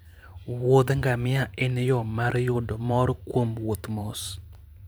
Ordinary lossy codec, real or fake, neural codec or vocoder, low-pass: none; fake; vocoder, 44.1 kHz, 128 mel bands every 512 samples, BigVGAN v2; none